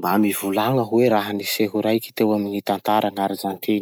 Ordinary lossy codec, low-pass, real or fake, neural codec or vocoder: none; none; real; none